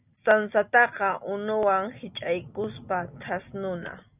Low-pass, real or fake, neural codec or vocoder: 3.6 kHz; real; none